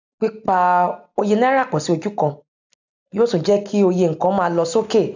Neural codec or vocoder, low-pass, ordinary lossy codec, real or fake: none; 7.2 kHz; none; real